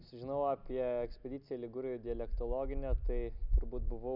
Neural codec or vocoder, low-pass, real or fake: none; 5.4 kHz; real